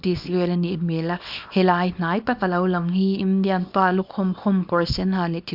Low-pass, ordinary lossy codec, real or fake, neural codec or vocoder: 5.4 kHz; AAC, 48 kbps; fake; codec, 24 kHz, 0.9 kbps, WavTokenizer, small release